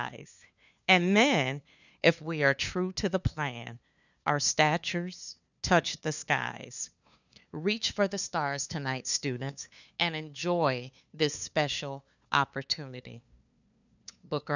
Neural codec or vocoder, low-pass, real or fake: codec, 16 kHz, 2 kbps, FunCodec, trained on LibriTTS, 25 frames a second; 7.2 kHz; fake